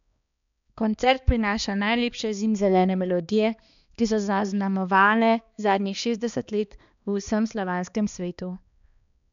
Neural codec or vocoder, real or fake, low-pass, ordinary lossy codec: codec, 16 kHz, 2 kbps, X-Codec, HuBERT features, trained on balanced general audio; fake; 7.2 kHz; none